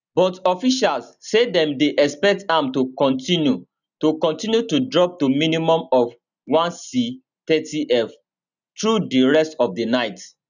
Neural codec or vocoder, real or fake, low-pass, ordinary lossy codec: none; real; 7.2 kHz; none